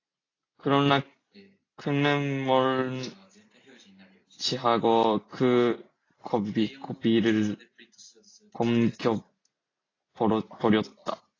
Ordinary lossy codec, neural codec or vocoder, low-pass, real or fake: AAC, 32 kbps; none; 7.2 kHz; real